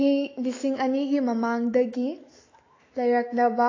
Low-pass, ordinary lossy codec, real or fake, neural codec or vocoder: 7.2 kHz; AAC, 32 kbps; fake; autoencoder, 48 kHz, 128 numbers a frame, DAC-VAE, trained on Japanese speech